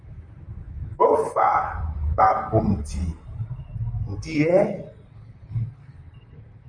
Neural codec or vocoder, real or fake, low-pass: vocoder, 44.1 kHz, 128 mel bands, Pupu-Vocoder; fake; 9.9 kHz